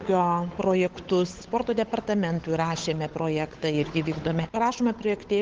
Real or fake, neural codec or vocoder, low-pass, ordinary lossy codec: fake; codec, 16 kHz, 8 kbps, FunCodec, trained on Chinese and English, 25 frames a second; 7.2 kHz; Opus, 16 kbps